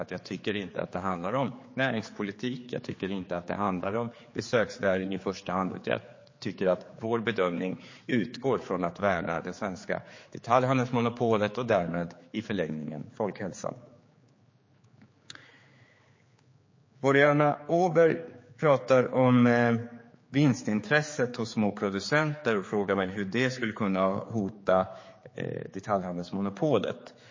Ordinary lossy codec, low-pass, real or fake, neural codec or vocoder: MP3, 32 kbps; 7.2 kHz; fake; codec, 16 kHz, 4 kbps, X-Codec, HuBERT features, trained on general audio